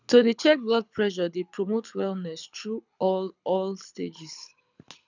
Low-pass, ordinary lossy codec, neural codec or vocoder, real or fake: 7.2 kHz; none; codec, 24 kHz, 6 kbps, HILCodec; fake